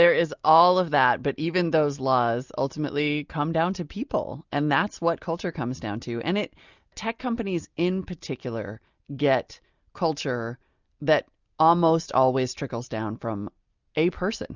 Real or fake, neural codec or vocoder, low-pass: real; none; 7.2 kHz